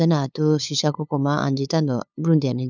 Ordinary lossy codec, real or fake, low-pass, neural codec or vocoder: none; fake; 7.2 kHz; codec, 16 kHz, 8 kbps, FunCodec, trained on LibriTTS, 25 frames a second